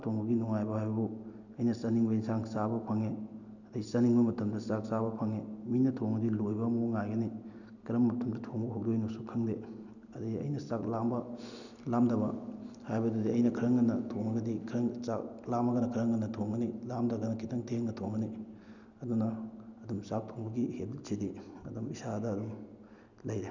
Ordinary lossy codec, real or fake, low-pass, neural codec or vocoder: none; real; 7.2 kHz; none